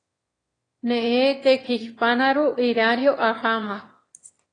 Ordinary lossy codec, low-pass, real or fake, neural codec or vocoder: AAC, 32 kbps; 9.9 kHz; fake; autoencoder, 22.05 kHz, a latent of 192 numbers a frame, VITS, trained on one speaker